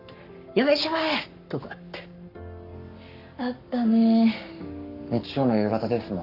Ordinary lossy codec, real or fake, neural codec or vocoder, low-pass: none; fake; codec, 44.1 kHz, 7.8 kbps, Pupu-Codec; 5.4 kHz